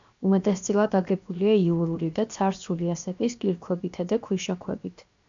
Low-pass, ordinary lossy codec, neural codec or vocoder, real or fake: 7.2 kHz; AAC, 64 kbps; codec, 16 kHz, 0.7 kbps, FocalCodec; fake